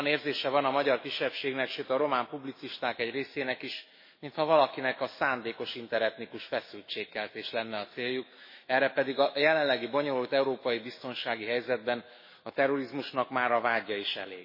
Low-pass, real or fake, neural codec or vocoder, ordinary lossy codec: 5.4 kHz; fake; autoencoder, 48 kHz, 128 numbers a frame, DAC-VAE, trained on Japanese speech; MP3, 24 kbps